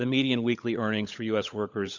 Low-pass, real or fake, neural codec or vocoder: 7.2 kHz; fake; codec, 16 kHz, 16 kbps, FunCodec, trained on LibriTTS, 50 frames a second